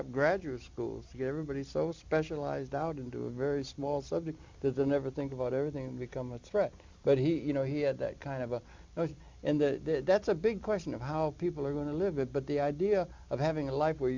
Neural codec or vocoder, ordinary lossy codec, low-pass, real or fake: none; MP3, 64 kbps; 7.2 kHz; real